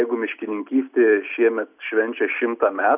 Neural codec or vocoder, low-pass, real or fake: none; 3.6 kHz; real